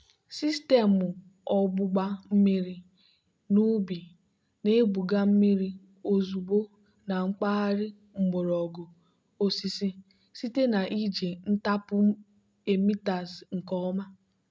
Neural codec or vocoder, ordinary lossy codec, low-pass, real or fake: none; none; none; real